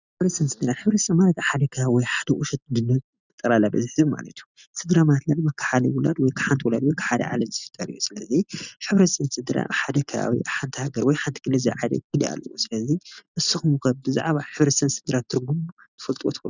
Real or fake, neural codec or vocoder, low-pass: real; none; 7.2 kHz